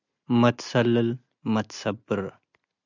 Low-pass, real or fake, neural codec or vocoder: 7.2 kHz; real; none